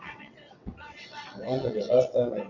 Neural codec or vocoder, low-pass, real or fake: vocoder, 22.05 kHz, 80 mel bands, WaveNeXt; 7.2 kHz; fake